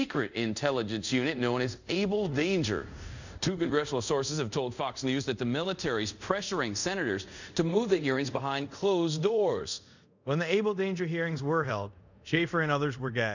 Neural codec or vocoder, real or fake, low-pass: codec, 24 kHz, 0.5 kbps, DualCodec; fake; 7.2 kHz